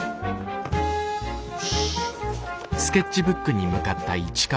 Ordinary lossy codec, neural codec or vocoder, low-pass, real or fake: none; none; none; real